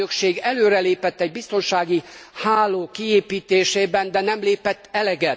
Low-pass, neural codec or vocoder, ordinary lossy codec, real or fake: none; none; none; real